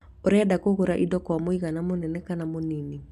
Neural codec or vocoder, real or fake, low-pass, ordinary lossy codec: none; real; 14.4 kHz; none